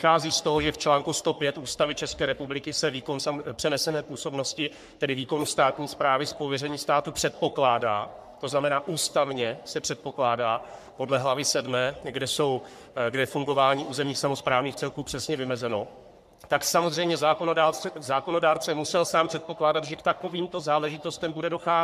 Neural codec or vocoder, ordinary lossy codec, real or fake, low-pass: codec, 44.1 kHz, 3.4 kbps, Pupu-Codec; MP3, 96 kbps; fake; 14.4 kHz